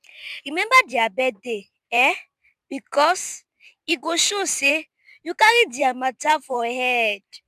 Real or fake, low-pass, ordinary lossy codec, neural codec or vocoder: fake; 14.4 kHz; none; vocoder, 44.1 kHz, 128 mel bands every 512 samples, BigVGAN v2